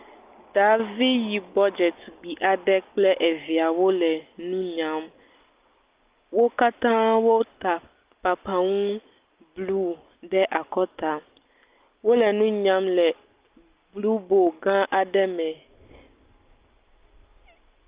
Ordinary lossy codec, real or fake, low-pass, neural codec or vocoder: Opus, 24 kbps; real; 3.6 kHz; none